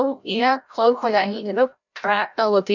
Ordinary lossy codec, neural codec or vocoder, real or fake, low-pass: none; codec, 16 kHz, 0.5 kbps, FreqCodec, larger model; fake; 7.2 kHz